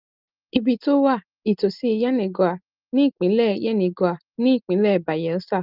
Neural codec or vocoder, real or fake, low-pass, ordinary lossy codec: none; real; 5.4 kHz; Opus, 32 kbps